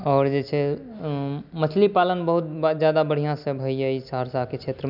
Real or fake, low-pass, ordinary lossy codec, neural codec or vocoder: real; 5.4 kHz; none; none